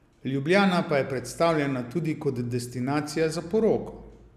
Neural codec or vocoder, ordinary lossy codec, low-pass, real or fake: none; none; 14.4 kHz; real